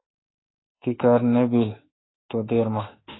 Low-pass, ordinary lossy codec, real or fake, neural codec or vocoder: 7.2 kHz; AAC, 16 kbps; fake; autoencoder, 48 kHz, 32 numbers a frame, DAC-VAE, trained on Japanese speech